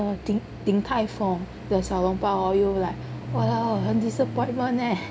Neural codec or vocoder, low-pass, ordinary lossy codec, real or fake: none; none; none; real